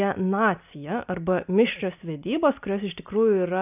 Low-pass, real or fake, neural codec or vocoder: 3.6 kHz; real; none